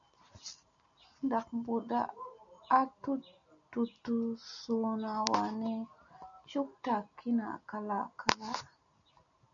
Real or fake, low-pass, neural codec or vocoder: real; 7.2 kHz; none